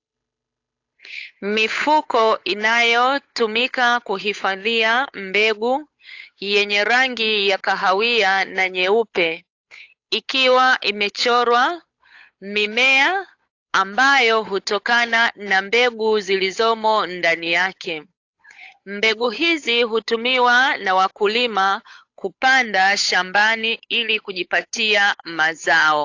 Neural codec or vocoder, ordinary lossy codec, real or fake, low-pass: codec, 16 kHz, 8 kbps, FunCodec, trained on Chinese and English, 25 frames a second; AAC, 48 kbps; fake; 7.2 kHz